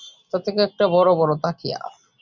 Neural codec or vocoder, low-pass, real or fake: none; 7.2 kHz; real